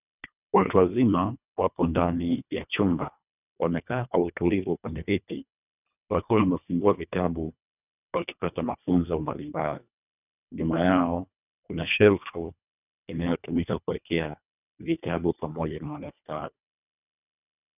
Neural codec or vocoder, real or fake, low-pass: codec, 24 kHz, 1.5 kbps, HILCodec; fake; 3.6 kHz